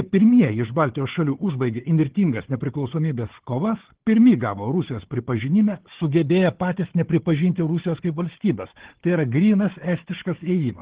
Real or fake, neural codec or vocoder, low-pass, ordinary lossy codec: fake; codec, 16 kHz, 4 kbps, FunCodec, trained on Chinese and English, 50 frames a second; 3.6 kHz; Opus, 16 kbps